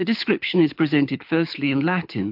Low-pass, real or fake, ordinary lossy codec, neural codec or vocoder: 5.4 kHz; fake; MP3, 48 kbps; codec, 24 kHz, 6 kbps, HILCodec